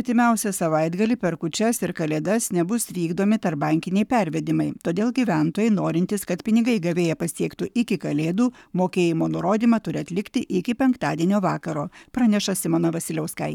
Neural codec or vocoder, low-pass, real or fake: codec, 44.1 kHz, 7.8 kbps, Pupu-Codec; 19.8 kHz; fake